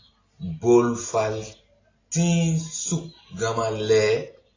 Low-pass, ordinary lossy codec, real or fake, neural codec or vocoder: 7.2 kHz; AAC, 32 kbps; real; none